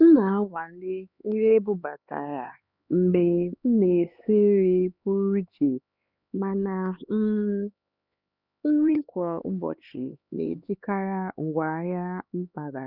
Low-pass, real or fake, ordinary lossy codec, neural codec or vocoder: 5.4 kHz; fake; none; codec, 16 kHz, 4 kbps, X-Codec, HuBERT features, trained on LibriSpeech